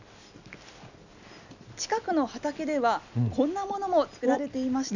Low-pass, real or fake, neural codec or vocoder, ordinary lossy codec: 7.2 kHz; real; none; none